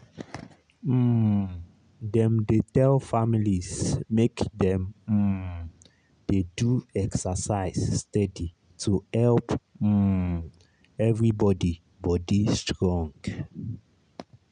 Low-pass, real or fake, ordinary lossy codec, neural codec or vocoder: 9.9 kHz; real; none; none